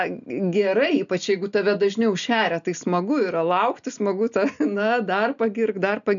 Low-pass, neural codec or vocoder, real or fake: 7.2 kHz; none; real